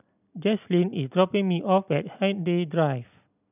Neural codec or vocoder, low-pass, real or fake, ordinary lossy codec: none; 3.6 kHz; real; none